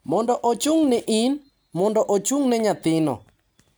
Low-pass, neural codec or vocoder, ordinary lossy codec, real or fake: none; none; none; real